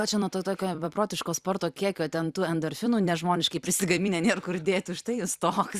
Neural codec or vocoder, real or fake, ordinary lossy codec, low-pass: vocoder, 44.1 kHz, 128 mel bands every 256 samples, BigVGAN v2; fake; Opus, 64 kbps; 14.4 kHz